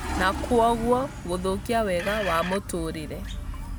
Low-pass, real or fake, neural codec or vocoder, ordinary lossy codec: none; real; none; none